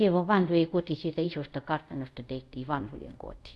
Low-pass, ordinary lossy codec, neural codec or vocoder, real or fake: none; none; codec, 24 kHz, 0.5 kbps, DualCodec; fake